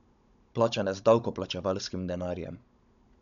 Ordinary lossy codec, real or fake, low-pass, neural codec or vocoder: none; fake; 7.2 kHz; codec, 16 kHz, 16 kbps, FunCodec, trained on Chinese and English, 50 frames a second